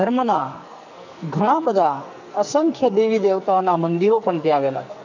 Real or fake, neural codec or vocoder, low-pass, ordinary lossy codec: fake; codec, 44.1 kHz, 2.6 kbps, SNAC; 7.2 kHz; none